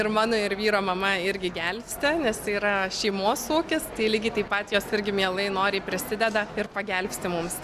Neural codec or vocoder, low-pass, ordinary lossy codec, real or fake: none; 14.4 kHz; Opus, 64 kbps; real